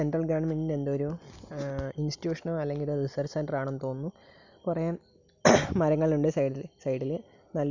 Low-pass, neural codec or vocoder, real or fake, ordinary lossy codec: 7.2 kHz; none; real; none